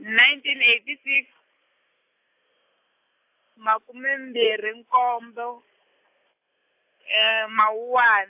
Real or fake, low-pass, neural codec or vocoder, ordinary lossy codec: real; 3.6 kHz; none; AAC, 32 kbps